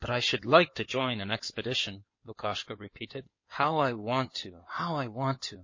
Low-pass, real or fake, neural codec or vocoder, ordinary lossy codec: 7.2 kHz; fake; codec, 16 kHz in and 24 kHz out, 2.2 kbps, FireRedTTS-2 codec; MP3, 32 kbps